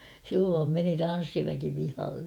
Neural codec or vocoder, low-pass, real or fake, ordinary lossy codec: vocoder, 48 kHz, 128 mel bands, Vocos; 19.8 kHz; fake; none